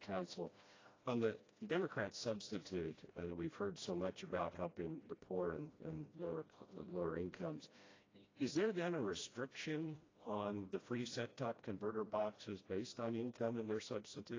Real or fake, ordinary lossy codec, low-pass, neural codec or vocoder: fake; AAC, 32 kbps; 7.2 kHz; codec, 16 kHz, 1 kbps, FreqCodec, smaller model